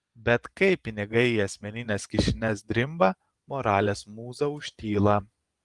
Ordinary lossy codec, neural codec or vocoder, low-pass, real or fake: Opus, 24 kbps; vocoder, 24 kHz, 100 mel bands, Vocos; 10.8 kHz; fake